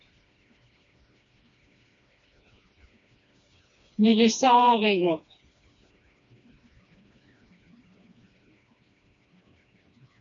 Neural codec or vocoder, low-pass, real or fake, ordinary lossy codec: codec, 16 kHz, 2 kbps, FreqCodec, smaller model; 7.2 kHz; fake; MP3, 48 kbps